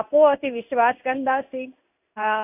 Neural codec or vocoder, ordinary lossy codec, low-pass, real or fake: codec, 16 kHz in and 24 kHz out, 1 kbps, XY-Tokenizer; none; 3.6 kHz; fake